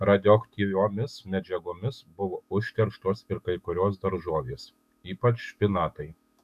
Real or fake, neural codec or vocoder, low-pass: fake; autoencoder, 48 kHz, 128 numbers a frame, DAC-VAE, trained on Japanese speech; 14.4 kHz